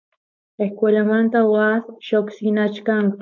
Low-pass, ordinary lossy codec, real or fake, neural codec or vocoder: 7.2 kHz; MP3, 48 kbps; fake; codec, 16 kHz, 4.8 kbps, FACodec